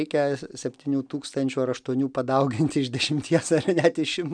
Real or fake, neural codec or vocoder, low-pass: real; none; 9.9 kHz